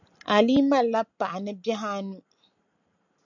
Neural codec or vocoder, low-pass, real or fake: none; 7.2 kHz; real